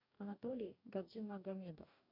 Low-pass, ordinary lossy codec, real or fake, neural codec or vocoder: 5.4 kHz; MP3, 48 kbps; fake; codec, 44.1 kHz, 2.6 kbps, DAC